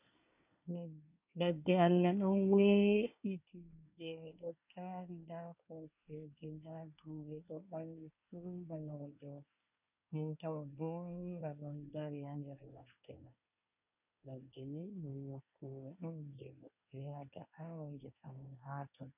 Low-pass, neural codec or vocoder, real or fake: 3.6 kHz; codec, 24 kHz, 1 kbps, SNAC; fake